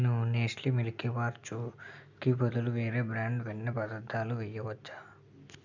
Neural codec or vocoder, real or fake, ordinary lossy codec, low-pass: none; real; none; 7.2 kHz